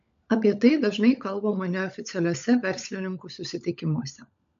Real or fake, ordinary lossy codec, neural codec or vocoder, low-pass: fake; MP3, 64 kbps; codec, 16 kHz, 16 kbps, FunCodec, trained on LibriTTS, 50 frames a second; 7.2 kHz